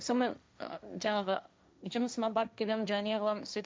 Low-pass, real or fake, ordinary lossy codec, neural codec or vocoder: none; fake; none; codec, 16 kHz, 1.1 kbps, Voila-Tokenizer